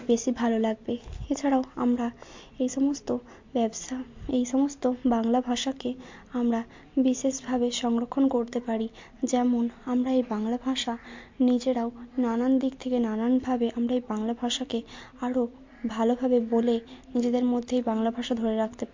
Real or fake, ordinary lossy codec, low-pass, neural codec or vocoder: real; MP3, 48 kbps; 7.2 kHz; none